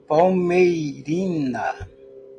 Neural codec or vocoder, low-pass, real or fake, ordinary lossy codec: none; 9.9 kHz; real; AAC, 48 kbps